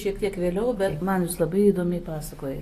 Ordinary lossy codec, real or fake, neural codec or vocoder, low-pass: AAC, 48 kbps; real; none; 14.4 kHz